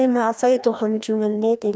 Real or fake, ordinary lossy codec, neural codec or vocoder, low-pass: fake; none; codec, 16 kHz, 1 kbps, FreqCodec, larger model; none